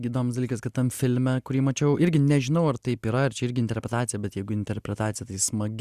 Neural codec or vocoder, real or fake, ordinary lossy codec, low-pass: none; real; Opus, 64 kbps; 14.4 kHz